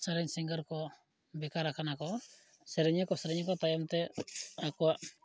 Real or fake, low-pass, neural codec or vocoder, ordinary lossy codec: real; none; none; none